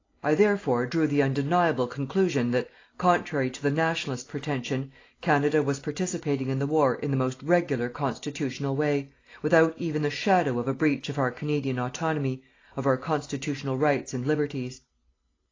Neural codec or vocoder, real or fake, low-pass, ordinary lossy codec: none; real; 7.2 kHz; AAC, 32 kbps